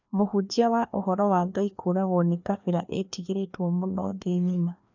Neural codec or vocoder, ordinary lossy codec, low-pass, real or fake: codec, 16 kHz, 2 kbps, FreqCodec, larger model; none; 7.2 kHz; fake